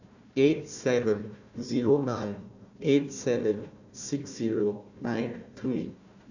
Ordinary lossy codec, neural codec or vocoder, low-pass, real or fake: none; codec, 16 kHz, 1 kbps, FunCodec, trained on Chinese and English, 50 frames a second; 7.2 kHz; fake